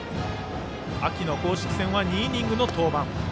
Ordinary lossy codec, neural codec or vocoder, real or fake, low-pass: none; none; real; none